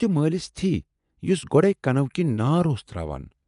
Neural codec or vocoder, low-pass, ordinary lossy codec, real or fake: vocoder, 24 kHz, 100 mel bands, Vocos; 10.8 kHz; none; fake